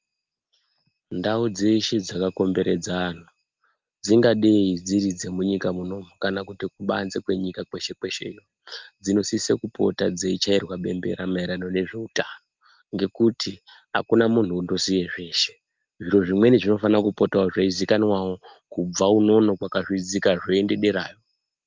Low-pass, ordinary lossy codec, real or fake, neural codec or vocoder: 7.2 kHz; Opus, 24 kbps; real; none